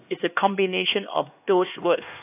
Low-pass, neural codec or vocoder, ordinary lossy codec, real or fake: 3.6 kHz; codec, 16 kHz, 2 kbps, X-Codec, HuBERT features, trained on LibriSpeech; AAC, 32 kbps; fake